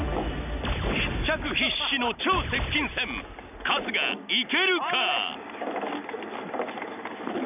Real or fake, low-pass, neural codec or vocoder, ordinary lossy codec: real; 3.6 kHz; none; none